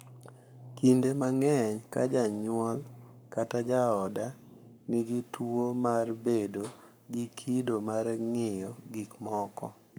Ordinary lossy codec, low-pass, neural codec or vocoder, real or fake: none; none; codec, 44.1 kHz, 7.8 kbps, Pupu-Codec; fake